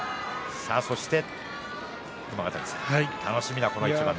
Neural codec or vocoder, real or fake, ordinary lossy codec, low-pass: none; real; none; none